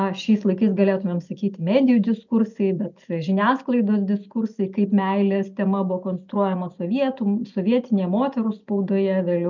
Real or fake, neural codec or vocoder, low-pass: real; none; 7.2 kHz